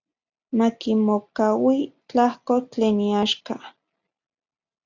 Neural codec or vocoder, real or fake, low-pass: none; real; 7.2 kHz